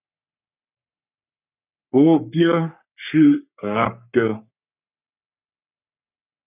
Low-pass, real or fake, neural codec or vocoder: 3.6 kHz; fake; codec, 44.1 kHz, 3.4 kbps, Pupu-Codec